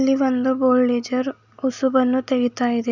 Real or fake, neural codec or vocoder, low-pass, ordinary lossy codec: real; none; 7.2 kHz; none